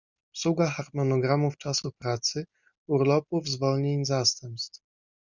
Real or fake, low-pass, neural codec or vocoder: real; 7.2 kHz; none